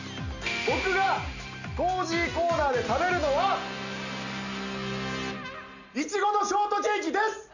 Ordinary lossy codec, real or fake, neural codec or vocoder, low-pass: none; real; none; 7.2 kHz